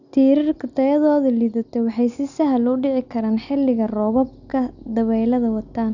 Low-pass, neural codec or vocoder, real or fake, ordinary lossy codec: 7.2 kHz; none; real; none